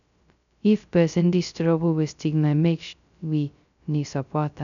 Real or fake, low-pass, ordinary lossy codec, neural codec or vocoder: fake; 7.2 kHz; none; codec, 16 kHz, 0.2 kbps, FocalCodec